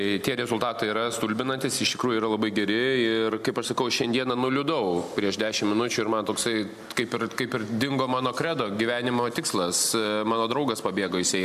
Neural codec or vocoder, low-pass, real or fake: none; 14.4 kHz; real